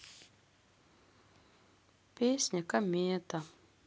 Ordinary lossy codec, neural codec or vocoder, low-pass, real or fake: none; none; none; real